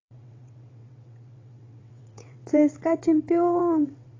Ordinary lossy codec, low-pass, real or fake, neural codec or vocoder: AAC, 32 kbps; 7.2 kHz; fake; vocoder, 22.05 kHz, 80 mel bands, WaveNeXt